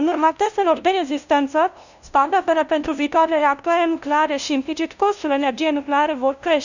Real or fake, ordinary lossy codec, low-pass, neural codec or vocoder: fake; none; 7.2 kHz; codec, 16 kHz, 0.5 kbps, FunCodec, trained on LibriTTS, 25 frames a second